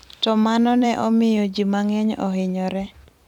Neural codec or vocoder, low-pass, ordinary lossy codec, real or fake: vocoder, 44.1 kHz, 128 mel bands every 256 samples, BigVGAN v2; 19.8 kHz; none; fake